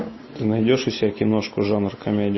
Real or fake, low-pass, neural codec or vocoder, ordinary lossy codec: real; 7.2 kHz; none; MP3, 24 kbps